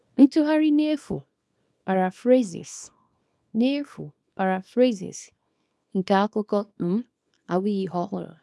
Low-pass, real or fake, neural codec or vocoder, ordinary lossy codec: none; fake; codec, 24 kHz, 0.9 kbps, WavTokenizer, small release; none